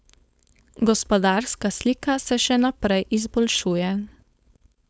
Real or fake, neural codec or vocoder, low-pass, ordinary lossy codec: fake; codec, 16 kHz, 4.8 kbps, FACodec; none; none